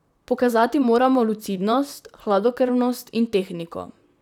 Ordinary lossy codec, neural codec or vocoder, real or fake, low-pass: none; vocoder, 44.1 kHz, 128 mel bands, Pupu-Vocoder; fake; 19.8 kHz